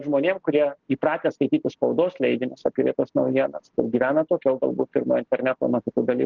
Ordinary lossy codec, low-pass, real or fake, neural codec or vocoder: Opus, 16 kbps; 7.2 kHz; real; none